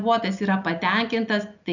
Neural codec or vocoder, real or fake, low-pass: none; real; 7.2 kHz